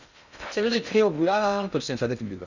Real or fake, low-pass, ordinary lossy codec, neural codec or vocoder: fake; 7.2 kHz; none; codec, 16 kHz in and 24 kHz out, 0.6 kbps, FocalCodec, streaming, 2048 codes